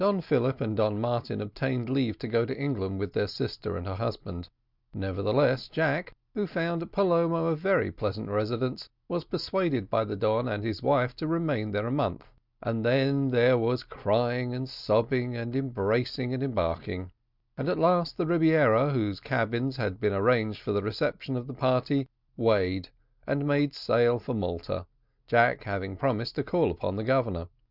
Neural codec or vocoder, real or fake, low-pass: none; real; 5.4 kHz